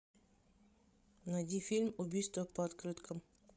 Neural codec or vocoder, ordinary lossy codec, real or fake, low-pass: codec, 16 kHz, 16 kbps, FreqCodec, larger model; none; fake; none